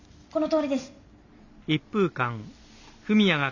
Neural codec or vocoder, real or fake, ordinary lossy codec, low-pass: none; real; none; 7.2 kHz